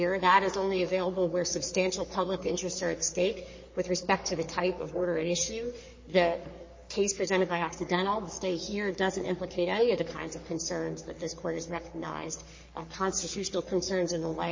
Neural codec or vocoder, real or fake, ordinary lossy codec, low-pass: codec, 44.1 kHz, 3.4 kbps, Pupu-Codec; fake; MP3, 32 kbps; 7.2 kHz